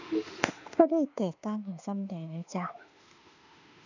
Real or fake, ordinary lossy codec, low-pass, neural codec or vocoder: fake; none; 7.2 kHz; autoencoder, 48 kHz, 32 numbers a frame, DAC-VAE, trained on Japanese speech